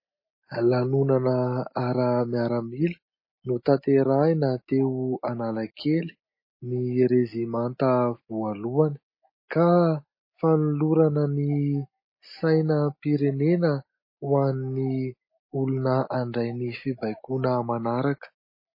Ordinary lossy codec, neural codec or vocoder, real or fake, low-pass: MP3, 24 kbps; none; real; 5.4 kHz